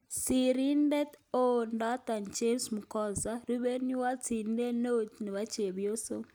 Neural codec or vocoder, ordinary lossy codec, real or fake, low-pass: none; none; real; none